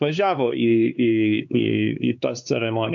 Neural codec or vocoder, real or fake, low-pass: codec, 16 kHz, 4 kbps, X-Codec, HuBERT features, trained on LibriSpeech; fake; 7.2 kHz